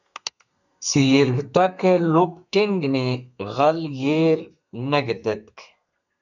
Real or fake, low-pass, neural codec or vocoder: fake; 7.2 kHz; codec, 32 kHz, 1.9 kbps, SNAC